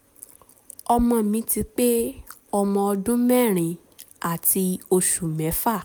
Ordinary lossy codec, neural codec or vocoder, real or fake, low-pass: none; none; real; none